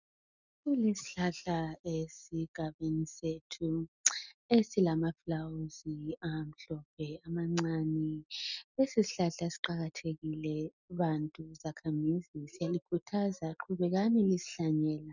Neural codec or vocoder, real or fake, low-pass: none; real; 7.2 kHz